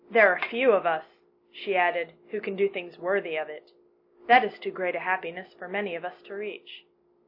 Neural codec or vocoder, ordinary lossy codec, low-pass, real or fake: none; MP3, 32 kbps; 5.4 kHz; real